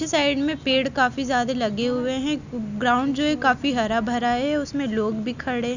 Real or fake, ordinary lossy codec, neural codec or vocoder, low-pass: real; none; none; 7.2 kHz